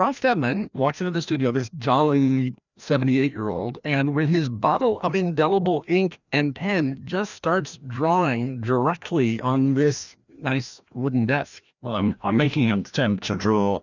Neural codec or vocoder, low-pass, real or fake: codec, 16 kHz, 1 kbps, FreqCodec, larger model; 7.2 kHz; fake